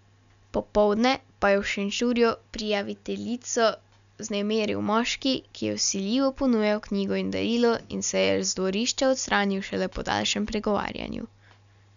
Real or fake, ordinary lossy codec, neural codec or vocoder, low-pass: real; MP3, 96 kbps; none; 7.2 kHz